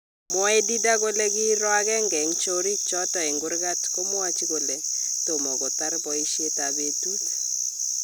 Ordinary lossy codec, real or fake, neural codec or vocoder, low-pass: none; real; none; none